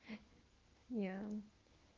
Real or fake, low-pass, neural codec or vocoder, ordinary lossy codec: fake; 7.2 kHz; autoencoder, 48 kHz, 128 numbers a frame, DAC-VAE, trained on Japanese speech; Opus, 16 kbps